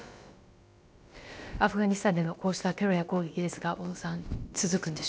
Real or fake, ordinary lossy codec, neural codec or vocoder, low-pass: fake; none; codec, 16 kHz, about 1 kbps, DyCAST, with the encoder's durations; none